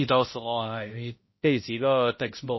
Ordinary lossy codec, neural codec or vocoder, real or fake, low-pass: MP3, 24 kbps; codec, 16 kHz, 0.5 kbps, X-Codec, HuBERT features, trained on balanced general audio; fake; 7.2 kHz